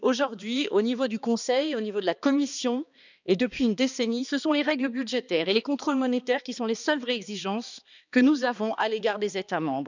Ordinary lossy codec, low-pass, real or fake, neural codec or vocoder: none; 7.2 kHz; fake; codec, 16 kHz, 2 kbps, X-Codec, HuBERT features, trained on balanced general audio